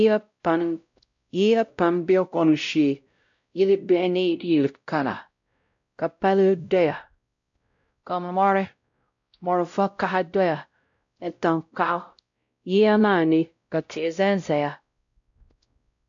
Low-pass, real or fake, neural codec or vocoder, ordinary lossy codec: 7.2 kHz; fake; codec, 16 kHz, 0.5 kbps, X-Codec, WavLM features, trained on Multilingual LibriSpeech; AAC, 64 kbps